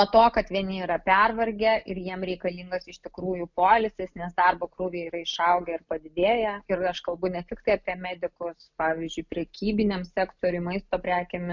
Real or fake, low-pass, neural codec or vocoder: real; 7.2 kHz; none